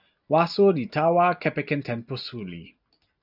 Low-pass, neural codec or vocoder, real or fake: 5.4 kHz; none; real